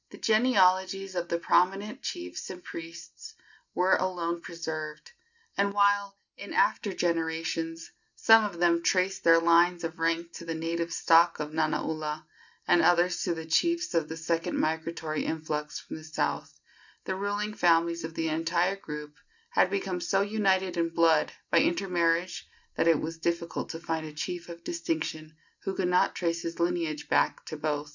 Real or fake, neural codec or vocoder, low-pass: real; none; 7.2 kHz